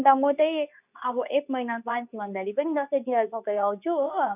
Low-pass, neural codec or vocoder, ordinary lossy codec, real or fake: 3.6 kHz; codec, 24 kHz, 0.9 kbps, WavTokenizer, medium speech release version 2; none; fake